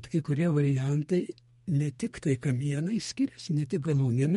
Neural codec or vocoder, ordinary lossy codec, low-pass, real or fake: codec, 32 kHz, 1.9 kbps, SNAC; MP3, 48 kbps; 14.4 kHz; fake